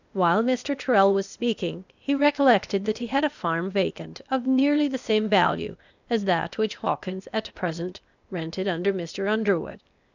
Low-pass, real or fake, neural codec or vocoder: 7.2 kHz; fake; codec, 16 kHz, 0.8 kbps, ZipCodec